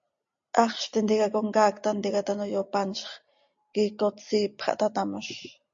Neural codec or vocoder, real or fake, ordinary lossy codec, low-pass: none; real; MP3, 48 kbps; 7.2 kHz